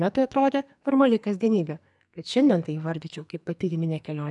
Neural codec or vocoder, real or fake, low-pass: codec, 44.1 kHz, 2.6 kbps, SNAC; fake; 10.8 kHz